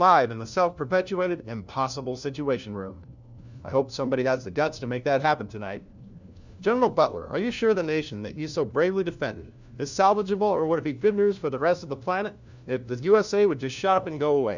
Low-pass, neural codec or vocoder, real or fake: 7.2 kHz; codec, 16 kHz, 1 kbps, FunCodec, trained on LibriTTS, 50 frames a second; fake